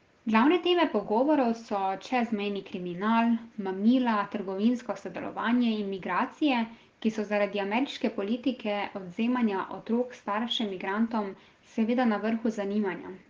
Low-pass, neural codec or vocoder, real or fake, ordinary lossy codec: 7.2 kHz; none; real; Opus, 16 kbps